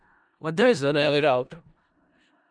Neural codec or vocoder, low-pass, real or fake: codec, 16 kHz in and 24 kHz out, 0.4 kbps, LongCat-Audio-Codec, four codebook decoder; 9.9 kHz; fake